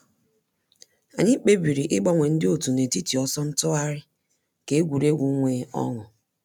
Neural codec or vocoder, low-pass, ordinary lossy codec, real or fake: none; 19.8 kHz; none; real